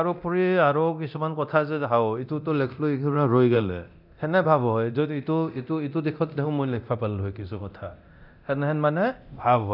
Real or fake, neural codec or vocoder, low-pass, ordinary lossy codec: fake; codec, 24 kHz, 0.9 kbps, DualCodec; 5.4 kHz; none